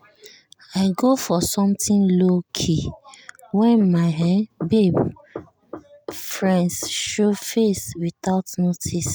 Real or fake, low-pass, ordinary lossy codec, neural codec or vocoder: real; none; none; none